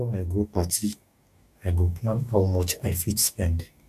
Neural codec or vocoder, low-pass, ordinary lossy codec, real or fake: codec, 44.1 kHz, 2.6 kbps, DAC; 14.4 kHz; none; fake